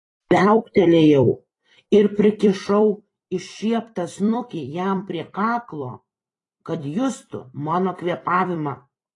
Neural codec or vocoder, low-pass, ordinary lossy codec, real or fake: vocoder, 44.1 kHz, 128 mel bands every 256 samples, BigVGAN v2; 10.8 kHz; AAC, 32 kbps; fake